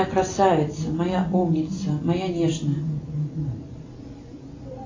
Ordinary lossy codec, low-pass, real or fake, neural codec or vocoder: AAC, 32 kbps; 7.2 kHz; real; none